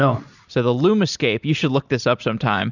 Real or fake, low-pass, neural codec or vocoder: real; 7.2 kHz; none